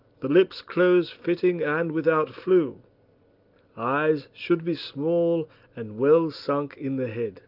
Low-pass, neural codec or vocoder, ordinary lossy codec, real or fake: 5.4 kHz; none; Opus, 32 kbps; real